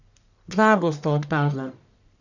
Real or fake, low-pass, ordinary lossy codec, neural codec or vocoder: fake; 7.2 kHz; none; codec, 44.1 kHz, 1.7 kbps, Pupu-Codec